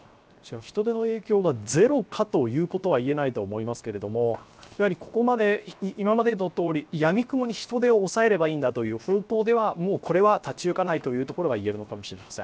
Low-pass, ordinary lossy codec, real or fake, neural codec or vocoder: none; none; fake; codec, 16 kHz, 0.7 kbps, FocalCodec